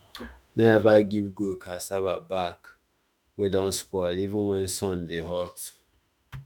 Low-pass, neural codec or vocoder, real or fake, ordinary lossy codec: none; autoencoder, 48 kHz, 32 numbers a frame, DAC-VAE, trained on Japanese speech; fake; none